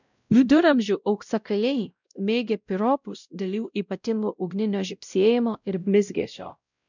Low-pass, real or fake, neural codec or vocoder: 7.2 kHz; fake; codec, 16 kHz, 0.5 kbps, X-Codec, WavLM features, trained on Multilingual LibriSpeech